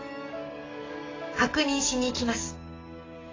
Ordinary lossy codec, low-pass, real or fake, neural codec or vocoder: AAC, 32 kbps; 7.2 kHz; fake; codec, 44.1 kHz, 7.8 kbps, DAC